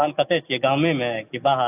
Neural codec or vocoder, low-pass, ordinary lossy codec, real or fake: none; 3.6 kHz; AAC, 32 kbps; real